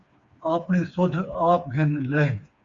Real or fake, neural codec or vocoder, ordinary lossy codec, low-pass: fake; codec, 16 kHz, 4 kbps, FreqCodec, smaller model; Opus, 64 kbps; 7.2 kHz